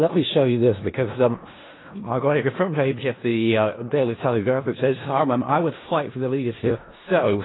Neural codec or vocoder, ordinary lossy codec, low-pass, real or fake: codec, 16 kHz in and 24 kHz out, 0.4 kbps, LongCat-Audio-Codec, four codebook decoder; AAC, 16 kbps; 7.2 kHz; fake